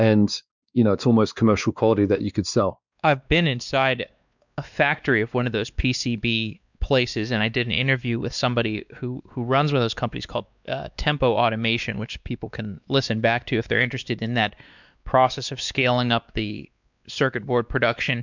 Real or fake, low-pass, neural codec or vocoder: fake; 7.2 kHz; codec, 16 kHz, 2 kbps, X-Codec, WavLM features, trained on Multilingual LibriSpeech